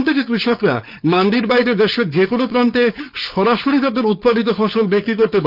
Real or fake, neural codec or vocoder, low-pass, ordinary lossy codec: fake; codec, 16 kHz, 4.8 kbps, FACodec; 5.4 kHz; none